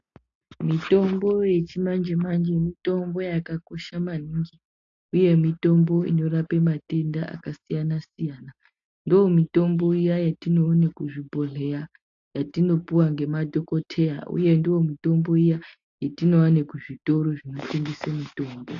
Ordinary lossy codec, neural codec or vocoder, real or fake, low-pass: AAC, 48 kbps; none; real; 7.2 kHz